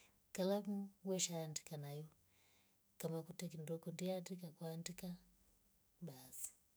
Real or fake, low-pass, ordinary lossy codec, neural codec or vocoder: fake; none; none; autoencoder, 48 kHz, 128 numbers a frame, DAC-VAE, trained on Japanese speech